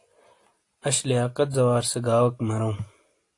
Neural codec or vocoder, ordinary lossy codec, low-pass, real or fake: none; AAC, 48 kbps; 10.8 kHz; real